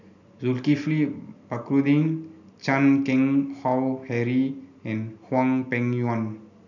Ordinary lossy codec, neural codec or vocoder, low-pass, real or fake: none; none; 7.2 kHz; real